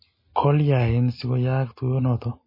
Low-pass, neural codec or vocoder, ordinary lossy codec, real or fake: 5.4 kHz; none; MP3, 24 kbps; real